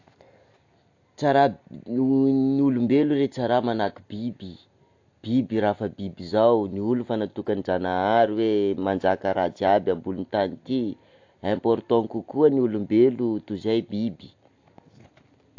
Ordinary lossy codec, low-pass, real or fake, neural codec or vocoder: AAC, 48 kbps; 7.2 kHz; real; none